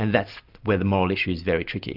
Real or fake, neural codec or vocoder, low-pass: real; none; 5.4 kHz